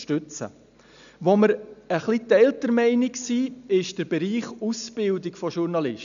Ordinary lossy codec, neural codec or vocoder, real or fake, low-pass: none; none; real; 7.2 kHz